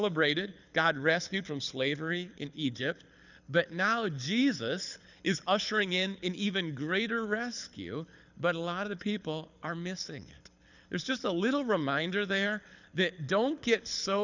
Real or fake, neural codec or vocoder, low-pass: fake; codec, 24 kHz, 6 kbps, HILCodec; 7.2 kHz